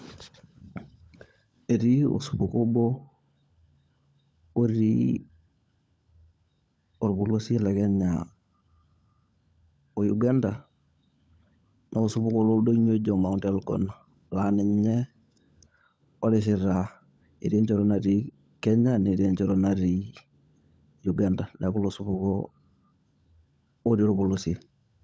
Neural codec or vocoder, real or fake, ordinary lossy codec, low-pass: codec, 16 kHz, 16 kbps, FunCodec, trained on LibriTTS, 50 frames a second; fake; none; none